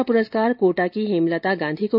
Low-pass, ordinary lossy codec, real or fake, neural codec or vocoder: 5.4 kHz; none; real; none